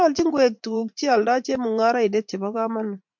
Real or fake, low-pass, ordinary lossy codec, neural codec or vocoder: fake; 7.2 kHz; MP3, 64 kbps; vocoder, 44.1 kHz, 80 mel bands, Vocos